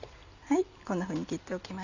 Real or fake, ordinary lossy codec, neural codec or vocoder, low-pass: real; none; none; 7.2 kHz